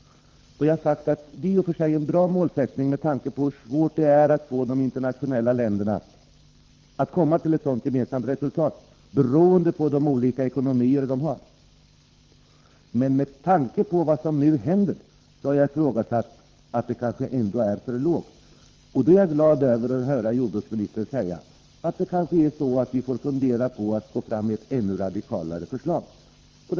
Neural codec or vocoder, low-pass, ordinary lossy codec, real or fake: codec, 24 kHz, 6 kbps, HILCodec; 7.2 kHz; Opus, 32 kbps; fake